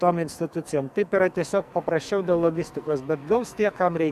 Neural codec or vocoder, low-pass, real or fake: codec, 44.1 kHz, 2.6 kbps, SNAC; 14.4 kHz; fake